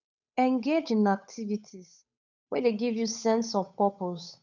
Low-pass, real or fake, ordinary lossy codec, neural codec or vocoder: 7.2 kHz; fake; none; codec, 16 kHz, 8 kbps, FunCodec, trained on Chinese and English, 25 frames a second